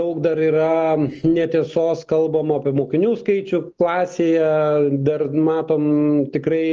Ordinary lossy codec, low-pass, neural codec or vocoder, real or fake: Opus, 24 kbps; 7.2 kHz; none; real